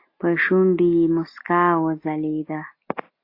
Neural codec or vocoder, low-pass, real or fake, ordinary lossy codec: none; 5.4 kHz; real; AAC, 32 kbps